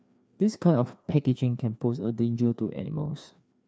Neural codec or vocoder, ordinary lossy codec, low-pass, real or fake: codec, 16 kHz, 2 kbps, FreqCodec, larger model; none; none; fake